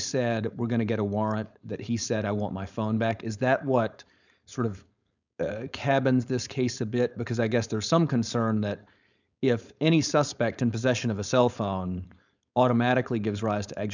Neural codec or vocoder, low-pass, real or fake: codec, 16 kHz, 4.8 kbps, FACodec; 7.2 kHz; fake